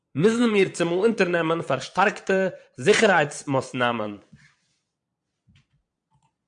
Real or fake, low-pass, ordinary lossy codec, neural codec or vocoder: fake; 9.9 kHz; MP3, 64 kbps; vocoder, 22.05 kHz, 80 mel bands, Vocos